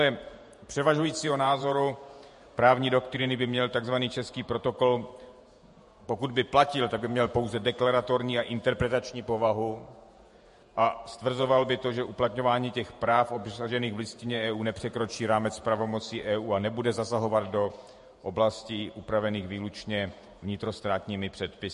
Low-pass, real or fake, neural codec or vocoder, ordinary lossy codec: 14.4 kHz; fake; vocoder, 48 kHz, 128 mel bands, Vocos; MP3, 48 kbps